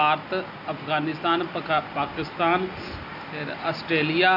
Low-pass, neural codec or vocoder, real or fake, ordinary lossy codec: 5.4 kHz; none; real; none